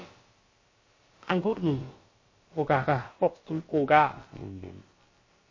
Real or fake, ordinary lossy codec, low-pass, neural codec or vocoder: fake; MP3, 32 kbps; 7.2 kHz; codec, 16 kHz, about 1 kbps, DyCAST, with the encoder's durations